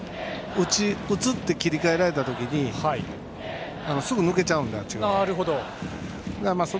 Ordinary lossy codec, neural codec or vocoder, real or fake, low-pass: none; none; real; none